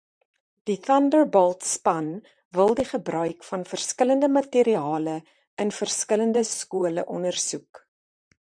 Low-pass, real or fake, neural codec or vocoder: 9.9 kHz; fake; vocoder, 44.1 kHz, 128 mel bands, Pupu-Vocoder